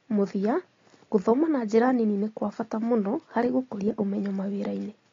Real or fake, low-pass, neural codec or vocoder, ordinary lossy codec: real; 7.2 kHz; none; AAC, 32 kbps